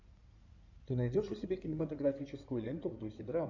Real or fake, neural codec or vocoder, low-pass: fake; codec, 16 kHz in and 24 kHz out, 2.2 kbps, FireRedTTS-2 codec; 7.2 kHz